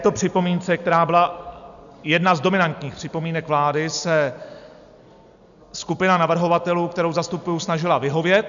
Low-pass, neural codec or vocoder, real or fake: 7.2 kHz; none; real